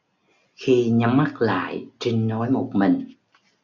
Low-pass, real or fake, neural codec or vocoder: 7.2 kHz; real; none